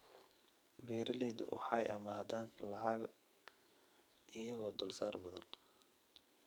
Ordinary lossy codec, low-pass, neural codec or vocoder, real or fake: none; none; codec, 44.1 kHz, 2.6 kbps, SNAC; fake